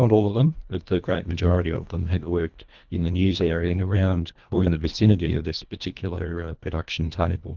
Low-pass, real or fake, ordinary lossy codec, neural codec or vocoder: 7.2 kHz; fake; Opus, 24 kbps; codec, 24 kHz, 1.5 kbps, HILCodec